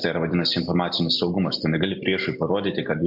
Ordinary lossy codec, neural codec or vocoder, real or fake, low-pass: AAC, 48 kbps; none; real; 5.4 kHz